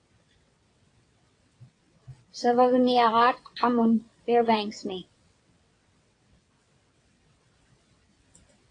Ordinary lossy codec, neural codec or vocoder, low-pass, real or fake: AAC, 48 kbps; vocoder, 22.05 kHz, 80 mel bands, WaveNeXt; 9.9 kHz; fake